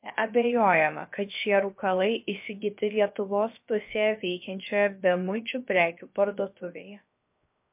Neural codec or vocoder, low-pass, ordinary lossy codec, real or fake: codec, 16 kHz, 0.7 kbps, FocalCodec; 3.6 kHz; MP3, 32 kbps; fake